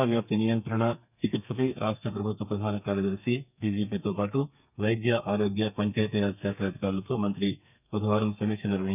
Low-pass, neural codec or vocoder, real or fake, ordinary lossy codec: 3.6 kHz; codec, 32 kHz, 1.9 kbps, SNAC; fake; none